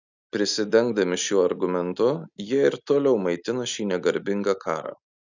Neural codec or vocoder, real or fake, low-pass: none; real; 7.2 kHz